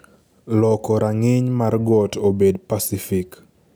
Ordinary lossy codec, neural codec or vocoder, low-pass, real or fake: none; none; none; real